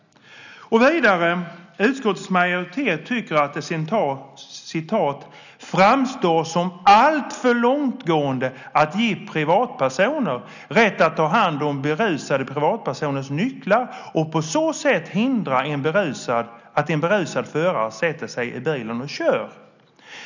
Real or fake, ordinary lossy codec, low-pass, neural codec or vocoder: real; none; 7.2 kHz; none